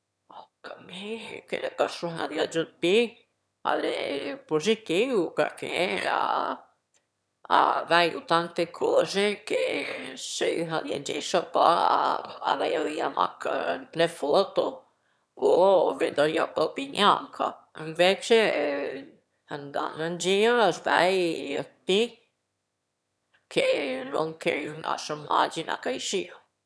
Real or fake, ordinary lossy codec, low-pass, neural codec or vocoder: fake; none; none; autoencoder, 22.05 kHz, a latent of 192 numbers a frame, VITS, trained on one speaker